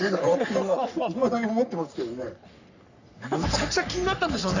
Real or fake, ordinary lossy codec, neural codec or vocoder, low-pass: fake; none; codec, 44.1 kHz, 3.4 kbps, Pupu-Codec; 7.2 kHz